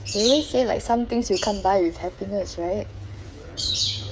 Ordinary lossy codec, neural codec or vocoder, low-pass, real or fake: none; codec, 16 kHz, 8 kbps, FreqCodec, smaller model; none; fake